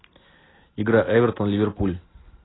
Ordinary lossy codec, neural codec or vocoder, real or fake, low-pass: AAC, 16 kbps; none; real; 7.2 kHz